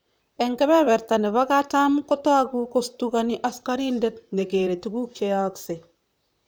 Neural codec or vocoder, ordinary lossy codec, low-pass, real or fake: vocoder, 44.1 kHz, 128 mel bands, Pupu-Vocoder; none; none; fake